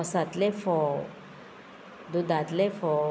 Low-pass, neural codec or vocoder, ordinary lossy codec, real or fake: none; none; none; real